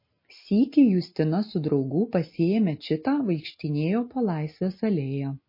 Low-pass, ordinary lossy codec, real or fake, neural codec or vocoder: 5.4 kHz; MP3, 24 kbps; real; none